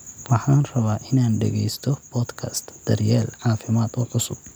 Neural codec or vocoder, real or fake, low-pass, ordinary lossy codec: none; real; none; none